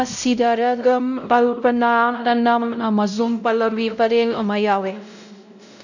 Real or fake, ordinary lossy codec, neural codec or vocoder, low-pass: fake; none; codec, 16 kHz, 0.5 kbps, X-Codec, WavLM features, trained on Multilingual LibriSpeech; 7.2 kHz